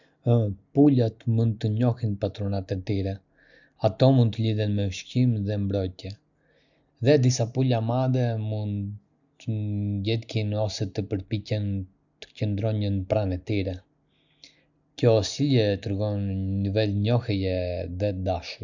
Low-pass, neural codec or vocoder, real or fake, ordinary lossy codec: 7.2 kHz; none; real; none